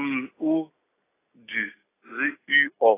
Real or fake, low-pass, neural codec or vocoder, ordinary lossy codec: real; 3.6 kHz; none; AAC, 16 kbps